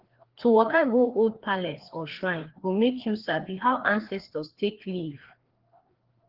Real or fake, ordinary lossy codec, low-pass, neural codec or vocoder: fake; Opus, 16 kbps; 5.4 kHz; codec, 16 kHz, 2 kbps, FreqCodec, larger model